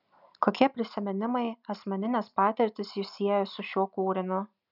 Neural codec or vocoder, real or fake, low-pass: none; real; 5.4 kHz